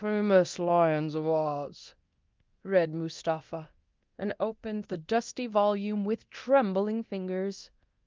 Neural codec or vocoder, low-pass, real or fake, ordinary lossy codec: codec, 24 kHz, 0.9 kbps, DualCodec; 7.2 kHz; fake; Opus, 32 kbps